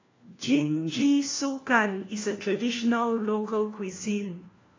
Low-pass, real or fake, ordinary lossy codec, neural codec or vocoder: 7.2 kHz; fake; AAC, 32 kbps; codec, 16 kHz, 1 kbps, FunCodec, trained on LibriTTS, 50 frames a second